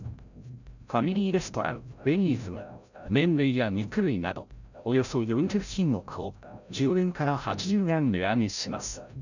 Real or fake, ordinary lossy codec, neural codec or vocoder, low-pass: fake; none; codec, 16 kHz, 0.5 kbps, FreqCodec, larger model; 7.2 kHz